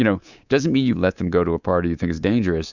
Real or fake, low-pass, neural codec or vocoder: fake; 7.2 kHz; codec, 24 kHz, 3.1 kbps, DualCodec